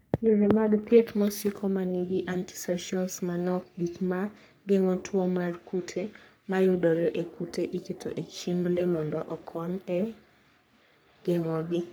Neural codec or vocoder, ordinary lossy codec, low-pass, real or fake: codec, 44.1 kHz, 3.4 kbps, Pupu-Codec; none; none; fake